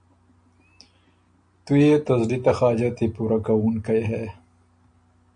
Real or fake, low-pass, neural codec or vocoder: real; 9.9 kHz; none